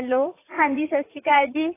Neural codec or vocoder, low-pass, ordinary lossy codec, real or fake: vocoder, 44.1 kHz, 80 mel bands, Vocos; 3.6 kHz; AAC, 16 kbps; fake